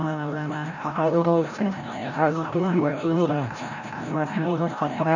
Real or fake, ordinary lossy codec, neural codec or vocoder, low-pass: fake; none; codec, 16 kHz, 0.5 kbps, FreqCodec, larger model; 7.2 kHz